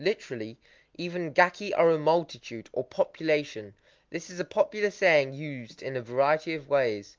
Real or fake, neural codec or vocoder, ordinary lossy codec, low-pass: real; none; Opus, 24 kbps; 7.2 kHz